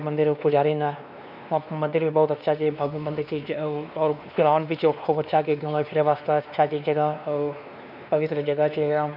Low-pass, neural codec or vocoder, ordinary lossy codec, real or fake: 5.4 kHz; codec, 16 kHz, 2 kbps, X-Codec, WavLM features, trained on Multilingual LibriSpeech; none; fake